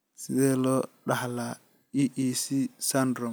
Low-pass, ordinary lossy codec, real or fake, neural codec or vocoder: none; none; real; none